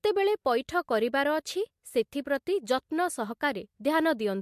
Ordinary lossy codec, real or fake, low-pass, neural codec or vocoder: MP3, 64 kbps; real; 14.4 kHz; none